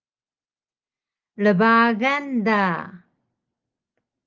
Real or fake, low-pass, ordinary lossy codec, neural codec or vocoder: real; 7.2 kHz; Opus, 24 kbps; none